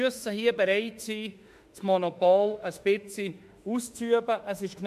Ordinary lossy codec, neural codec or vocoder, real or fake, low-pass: MP3, 64 kbps; autoencoder, 48 kHz, 32 numbers a frame, DAC-VAE, trained on Japanese speech; fake; 14.4 kHz